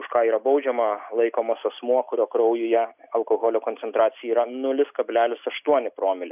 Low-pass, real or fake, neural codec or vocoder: 3.6 kHz; real; none